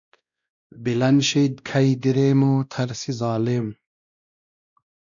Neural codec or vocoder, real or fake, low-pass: codec, 16 kHz, 1 kbps, X-Codec, WavLM features, trained on Multilingual LibriSpeech; fake; 7.2 kHz